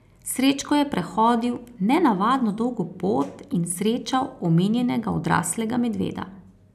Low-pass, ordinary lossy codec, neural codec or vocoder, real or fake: 14.4 kHz; none; none; real